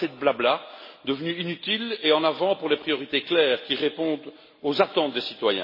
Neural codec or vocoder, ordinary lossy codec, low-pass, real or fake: none; MP3, 24 kbps; 5.4 kHz; real